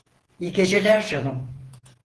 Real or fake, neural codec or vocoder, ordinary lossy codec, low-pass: fake; vocoder, 48 kHz, 128 mel bands, Vocos; Opus, 16 kbps; 10.8 kHz